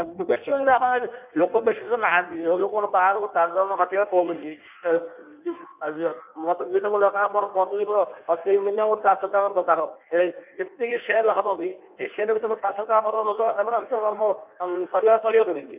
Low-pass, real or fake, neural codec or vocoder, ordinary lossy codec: 3.6 kHz; fake; codec, 16 kHz in and 24 kHz out, 1.1 kbps, FireRedTTS-2 codec; none